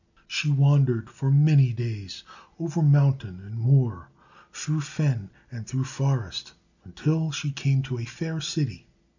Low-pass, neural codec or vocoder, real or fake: 7.2 kHz; none; real